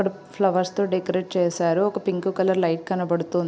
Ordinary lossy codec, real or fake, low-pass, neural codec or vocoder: none; real; none; none